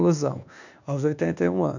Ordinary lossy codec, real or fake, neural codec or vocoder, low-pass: none; fake; codec, 24 kHz, 1.2 kbps, DualCodec; 7.2 kHz